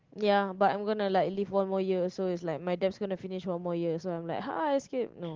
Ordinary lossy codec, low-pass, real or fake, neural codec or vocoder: Opus, 16 kbps; 7.2 kHz; real; none